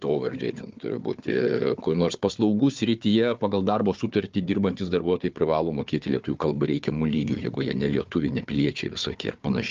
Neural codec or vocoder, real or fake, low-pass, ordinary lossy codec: codec, 16 kHz, 4 kbps, FunCodec, trained on Chinese and English, 50 frames a second; fake; 7.2 kHz; Opus, 24 kbps